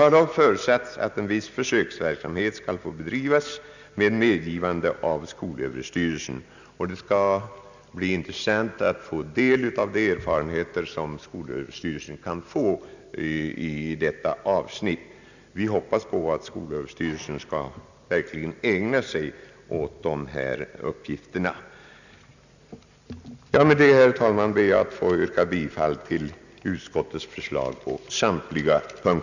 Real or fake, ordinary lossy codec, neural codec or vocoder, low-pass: real; none; none; 7.2 kHz